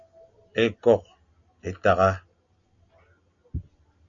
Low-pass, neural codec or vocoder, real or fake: 7.2 kHz; none; real